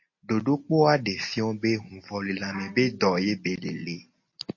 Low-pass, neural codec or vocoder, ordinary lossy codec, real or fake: 7.2 kHz; none; MP3, 32 kbps; real